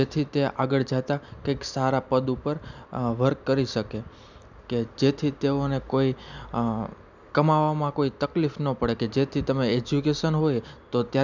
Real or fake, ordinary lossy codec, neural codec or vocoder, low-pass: real; none; none; 7.2 kHz